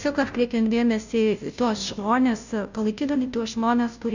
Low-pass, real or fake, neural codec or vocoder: 7.2 kHz; fake; codec, 16 kHz, 0.5 kbps, FunCodec, trained on Chinese and English, 25 frames a second